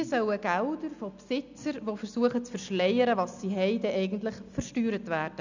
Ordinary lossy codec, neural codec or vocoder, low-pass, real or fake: none; none; 7.2 kHz; real